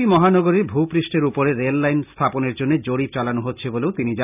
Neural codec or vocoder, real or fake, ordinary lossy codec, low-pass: none; real; none; 3.6 kHz